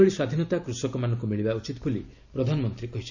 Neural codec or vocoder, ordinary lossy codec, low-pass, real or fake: none; none; 7.2 kHz; real